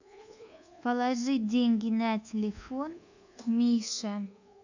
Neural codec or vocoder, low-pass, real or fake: codec, 24 kHz, 1.2 kbps, DualCodec; 7.2 kHz; fake